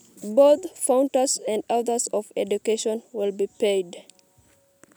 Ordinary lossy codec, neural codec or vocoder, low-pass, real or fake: none; none; none; real